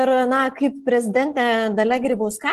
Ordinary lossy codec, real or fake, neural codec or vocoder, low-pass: Opus, 16 kbps; real; none; 14.4 kHz